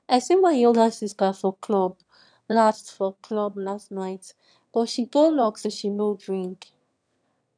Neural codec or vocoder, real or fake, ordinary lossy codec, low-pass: autoencoder, 22.05 kHz, a latent of 192 numbers a frame, VITS, trained on one speaker; fake; none; 9.9 kHz